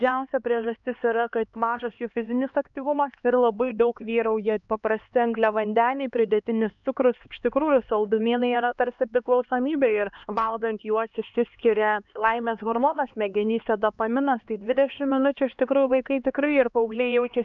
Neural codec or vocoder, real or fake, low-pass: codec, 16 kHz, 2 kbps, X-Codec, HuBERT features, trained on LibriSpeech; fake; 7.2 kHz